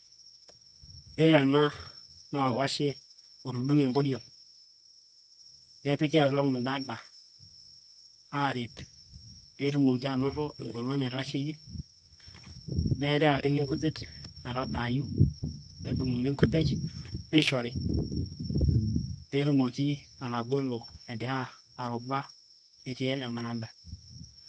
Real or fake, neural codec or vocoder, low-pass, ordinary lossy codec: fake; codec, 24 kHz, 0.9 kbps, WavTokenizer, medium music audio release; none; none